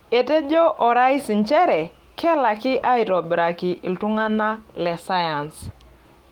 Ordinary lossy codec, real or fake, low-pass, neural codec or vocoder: Opus, 24 kbps; fake; 19.8 kHz; autoencoder, 48 kHz, 128 numbers a frame, DAC-VAE, trained on Japanese speech